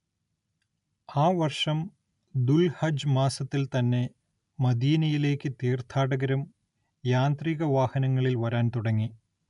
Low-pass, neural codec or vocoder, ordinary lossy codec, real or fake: 10.8 kHz; none; none; real